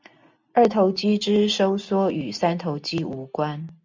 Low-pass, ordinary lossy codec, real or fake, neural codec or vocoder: 7.2 kHz; MP3, 64 kbps; real; none